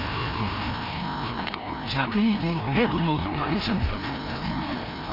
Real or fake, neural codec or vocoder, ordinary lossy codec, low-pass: fake; codec, 16 kHz, 1 kbps, FreqCodec, larger model; AAC, 32 kbps; 5.4 kHz